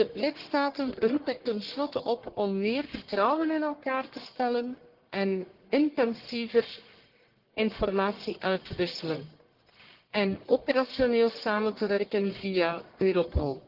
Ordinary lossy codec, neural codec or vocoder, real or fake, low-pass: Opus, 16 kbps; codec, 44.1 kHz, 1.7 kbps, Pupu-Codec; fake; 5.4 kHz